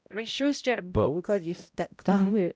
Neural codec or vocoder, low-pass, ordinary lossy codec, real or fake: codec, 16 kHz, 0.5 kbps, X-Codec, HuBERT features, trained on balanced general audio; none; none; fake